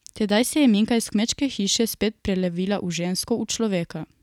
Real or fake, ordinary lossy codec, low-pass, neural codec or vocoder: real; none; 19.8 kHz; none